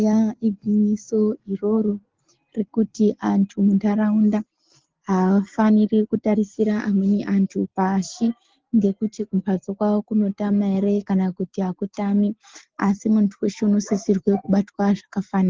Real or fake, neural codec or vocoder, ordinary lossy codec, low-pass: real; none; Opus, 16 kbps; 7.2 kHz